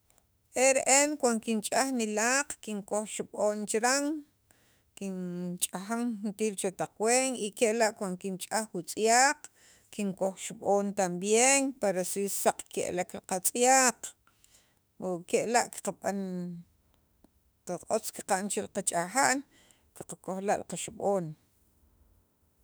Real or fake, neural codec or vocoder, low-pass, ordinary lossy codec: fake; autoencoder, 48 kHz, 32 numbers a frame, DAC-VAE, trained on Japanese speech; none; none